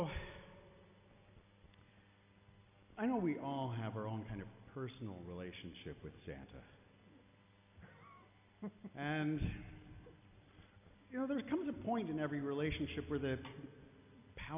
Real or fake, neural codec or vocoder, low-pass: real; none; 3.6 kHz